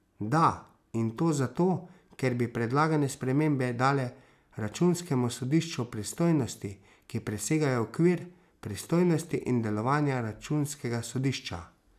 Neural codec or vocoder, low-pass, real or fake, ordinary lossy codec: none; 14.4 kHz; real; none